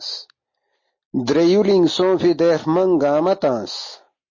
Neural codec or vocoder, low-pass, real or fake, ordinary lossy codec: none; 7.2 kHz; real; MP3, 32 kbps